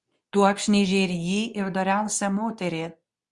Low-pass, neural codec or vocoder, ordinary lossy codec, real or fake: 10.8 kHz; codec, 24 kHz, 0.9 kbps, WavTokenizer, medium speech release version 2; Opus, 64 kbps; fake